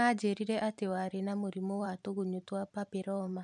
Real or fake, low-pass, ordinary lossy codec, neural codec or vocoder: fake; 10.8 kHz; none; vocoder, 44.1 kHz, 128 mel bands every 512 samples, BigVGAN v2